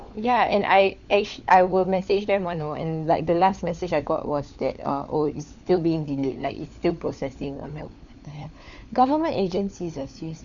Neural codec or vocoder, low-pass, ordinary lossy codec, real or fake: codec, 16 kHz, 4 kbps, FunCodec, trained on LibriTTS, 50 frames a second; 7.2 kHz; none; fake